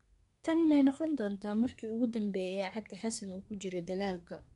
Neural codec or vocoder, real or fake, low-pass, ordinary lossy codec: codec, 24 kHz, 1 kbps, SNAC; fake; 10.8 kHz; none